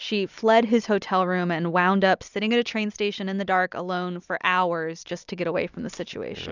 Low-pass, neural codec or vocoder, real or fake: 7.2 kHz; vocoder, 44.1 kHz, 128 mel bands every 256 samples, BigVGAN v2; fake